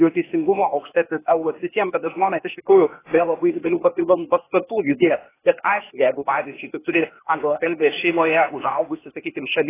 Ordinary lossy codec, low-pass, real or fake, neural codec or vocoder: AAC, 16 kbps; 3.6 kHz; fake; codec, 16 kHz, 0.8 kbps, ZipCodec